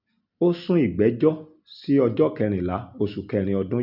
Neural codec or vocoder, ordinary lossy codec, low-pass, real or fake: none; none; 5.4 kHz; real